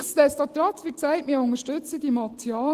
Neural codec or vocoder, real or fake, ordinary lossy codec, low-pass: none; real; Opus, 16 kbps; 14.4 kHz